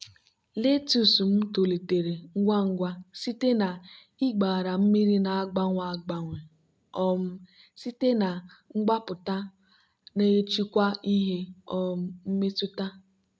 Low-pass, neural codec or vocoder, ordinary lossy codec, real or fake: none; none; none; real